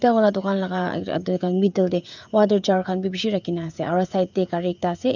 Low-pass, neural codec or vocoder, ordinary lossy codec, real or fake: 7.2 kHz; codec, 16 kHz, 16 kbps, FreqCodec, smaller model; none; fake